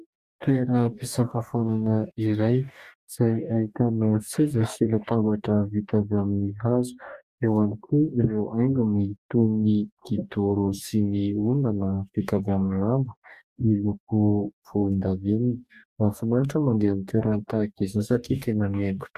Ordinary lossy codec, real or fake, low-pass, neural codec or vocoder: Opus, 64 kbps; fake; 14.4 kHz; codec, 44.1 kHz, 2.6 kbps, DAC